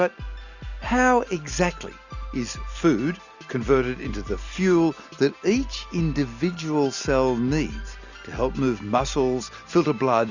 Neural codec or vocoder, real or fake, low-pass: none; real; 7.2 kHz